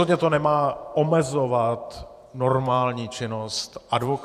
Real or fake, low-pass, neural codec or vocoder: fake; 14.4 kHz; vocoder, 48 kHz, 128 mel bands, Vocos